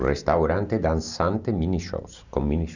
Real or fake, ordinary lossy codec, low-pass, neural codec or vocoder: real; none; 7.2 kHz; none